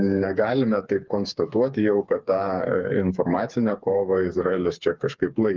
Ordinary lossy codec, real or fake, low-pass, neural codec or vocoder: Opus, 24 kbps; fake; 7.2 kHz; codec, 16 kHz, 4 kbps, FreqCodec, smaller model